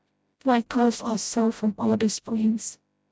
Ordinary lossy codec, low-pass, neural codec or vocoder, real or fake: none; none; codec, 16 kHz, 0.5 kbps, FreqCodec, smaller model; fake